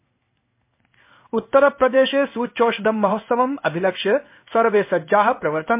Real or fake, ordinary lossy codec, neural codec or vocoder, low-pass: fake; MP3, 24 kbps; codec, 16 kHz in and 24 kHz out, 1 kbps, XY-Tokenizer; 3.6 kHz